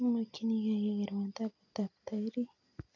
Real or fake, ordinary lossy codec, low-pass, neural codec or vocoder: real; none; 7.2 kHz; none